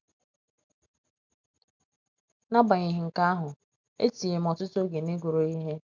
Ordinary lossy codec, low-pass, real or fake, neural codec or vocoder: MP3, 64 kbps; 7.2 kHz; real; none